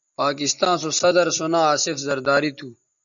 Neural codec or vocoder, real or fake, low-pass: none; real; 7.2 kHz